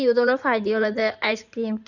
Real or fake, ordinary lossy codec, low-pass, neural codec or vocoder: fake; none; 7.2 kHz; codec, 16 kHz in and 24 kHz out, 2.2 kbps, FireRedTTS-2 codec